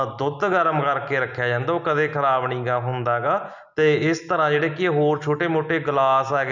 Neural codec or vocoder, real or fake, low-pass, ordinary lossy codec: none; real; 7.2 kHz; none